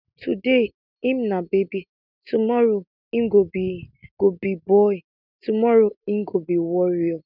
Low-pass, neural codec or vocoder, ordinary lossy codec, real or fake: 5.4 kHz; none; none; real